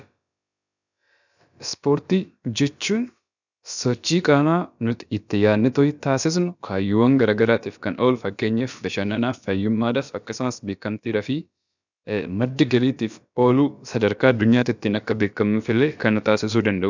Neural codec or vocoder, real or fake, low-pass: codec, 16 kHz, about 1 kbps, DyCAST, with the encoder's durations; fake; 7.2 kHz